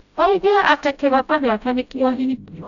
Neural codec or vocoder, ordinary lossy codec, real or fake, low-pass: codec, 16 kHz, 0.5 kbps, FreqCodec, smaller model; none; fake; 7.2 kHz